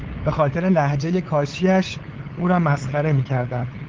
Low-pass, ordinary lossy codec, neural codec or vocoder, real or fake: 7.2 kHz; Opus, 16 kbps; codec, 16 kHz, 8 kbps, FunCodec, trained on LibriTTS, 25 frames a second; fake